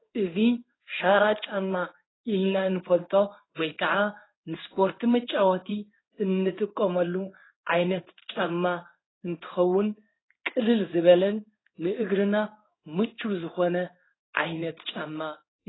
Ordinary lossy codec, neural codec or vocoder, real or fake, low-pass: AAC, 16 kbps; codec, 16 kHz in and 24 kHz out, 1 kbps, XY-Tokenizer; fake; 7.2 kHz